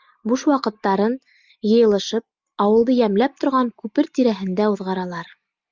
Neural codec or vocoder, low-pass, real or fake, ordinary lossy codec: none; 7.2 kHz; real; Opus, 24 kbps